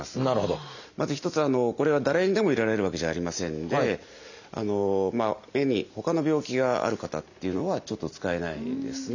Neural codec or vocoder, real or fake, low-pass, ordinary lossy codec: none; real; 7.2 kHz; none